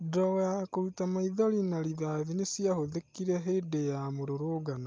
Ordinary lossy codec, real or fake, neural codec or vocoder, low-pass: Opus, 24 kbps; real; none; 7.2 kHz